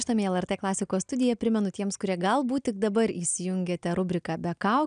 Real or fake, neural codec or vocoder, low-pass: real; none; 9.9 kHz